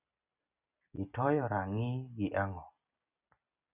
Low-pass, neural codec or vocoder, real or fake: 3.6 kHz; none; real